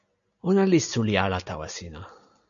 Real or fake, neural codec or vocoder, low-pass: real; none; 7.2 kHz